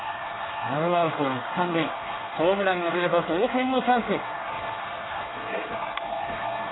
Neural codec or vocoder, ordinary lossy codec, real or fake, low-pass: codec, 24 kHz, 1 kbps, SNAC; AAC, 16 kbps; fake; 7.2 kHz